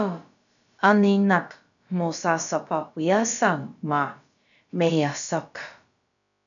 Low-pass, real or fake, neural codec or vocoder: 7.2 kHz; fake; codec, 16 kHz, about 1 kbps, DyCAST, with the encoder's durations